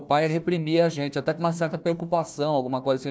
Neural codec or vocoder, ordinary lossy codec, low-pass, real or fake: codec, 16 kHz, 1 kbps, FunCodec, trained on Chinese and English, 50 frames a second; none; none; fake